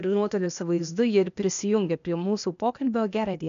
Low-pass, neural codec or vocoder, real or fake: 7.2 kHz; codec, 16 kHz, 0.8 kbps, ZipCodec; fake